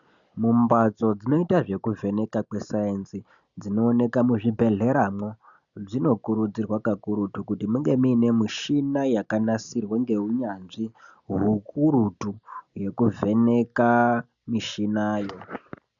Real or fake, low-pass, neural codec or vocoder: real; 7.2 kHz; none